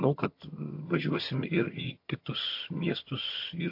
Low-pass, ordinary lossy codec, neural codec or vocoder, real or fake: 5.4 kHz; MP3, 32 kbps; vocoder, 22.05 kHz, 80 mel bands, HiFi-GAN; fake